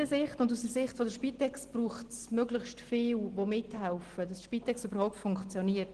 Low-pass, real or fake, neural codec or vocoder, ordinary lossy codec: 9.9 kHz; real; none; Opus, 16 kbps